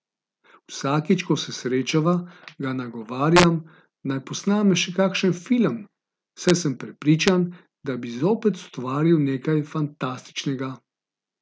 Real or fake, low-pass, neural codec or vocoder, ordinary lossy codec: real; none; none; none